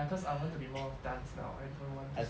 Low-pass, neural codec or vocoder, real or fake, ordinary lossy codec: none; none; real; none